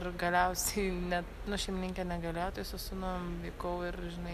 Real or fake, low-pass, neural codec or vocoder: real; 14.4 kHz; none